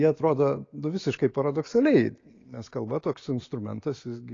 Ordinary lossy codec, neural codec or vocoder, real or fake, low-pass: AAC, 32 kbps; none; real; 7.2 kHz